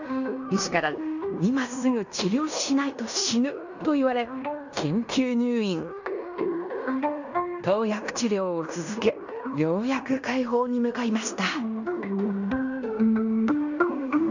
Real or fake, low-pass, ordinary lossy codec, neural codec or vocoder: fake; 7.2 kHz; none; codec, 16 kHz in and 24 kHz out, 0.9 kbps, LongCat-Audio-Codec, fine tuned four codebook decoder